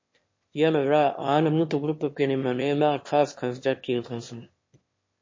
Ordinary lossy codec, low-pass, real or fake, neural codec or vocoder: MP3, 32 kbps; 7.2 kHz; fake; autoencoder, 22.05 kHz, a latent of 192 numbers a frame, VITS, trained on one speaker